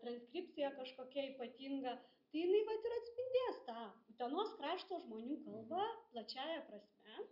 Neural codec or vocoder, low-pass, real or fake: none; 5.4 kHz; real